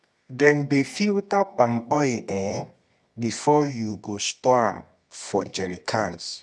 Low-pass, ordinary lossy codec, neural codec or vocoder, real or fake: none; none; codec, 24 kHz, 0.9 kbps, WavTokenizer, medium music audio release; fake